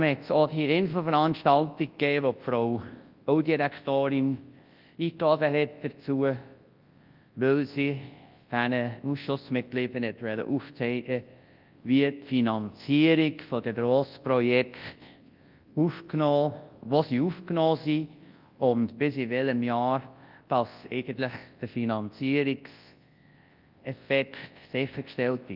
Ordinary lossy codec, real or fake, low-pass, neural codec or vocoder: Opus, 32 kbps; fake; 5.4 kHz; codec, 24 kHz, 0.9 kbps, WavTokenizer, large speech release